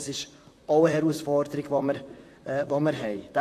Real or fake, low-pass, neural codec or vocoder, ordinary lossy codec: fake; 14.4 kHz; vocoder, 44.1 kHz, 128 mel bands, Pupu-Vocoder; AAC, 64 kbps